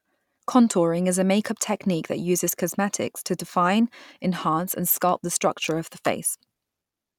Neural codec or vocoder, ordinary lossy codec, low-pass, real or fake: none; none; 19.8 kHz; real